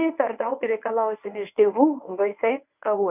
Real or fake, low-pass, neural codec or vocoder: fake; 3.6 kHz; codec, 24 kHz, 0.9 kbps, WavTokenizer, medium speech release version 1